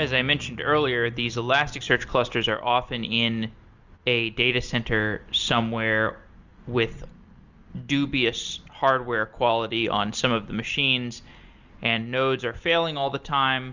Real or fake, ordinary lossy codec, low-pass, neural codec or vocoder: real; Opus, 64 kbps; 7.2 kHz; none